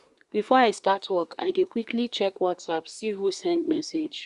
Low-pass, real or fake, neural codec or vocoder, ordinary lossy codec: 10.8 kHz; fake; codec, 24 kHz, 1 kbps, SNAC; Opus, 64 kbps